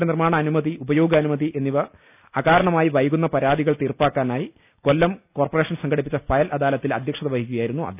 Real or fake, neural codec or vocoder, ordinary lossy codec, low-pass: real; none; none; 3.6 kHz